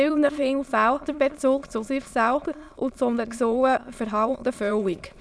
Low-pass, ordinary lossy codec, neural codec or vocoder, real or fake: none; none; autoencoder, 22.05 kHz, a latent of 192 numbers a frame, VITS, trained on many speakers; fake